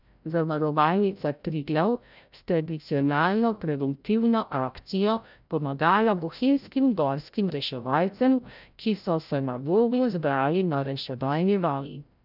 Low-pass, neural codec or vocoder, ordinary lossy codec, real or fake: 5.4 kHz; codec, 16 kHz, 0.5 kbps, FreqCodec, larger model; none; fake